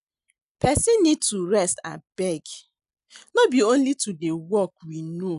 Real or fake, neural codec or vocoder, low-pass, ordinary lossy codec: real; none; 10.8 kHz; none